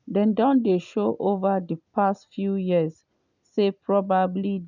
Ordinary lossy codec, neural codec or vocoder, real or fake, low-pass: none; none; real; 7.2 kHz